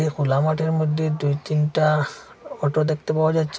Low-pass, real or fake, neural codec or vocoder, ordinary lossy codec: none; real; none; none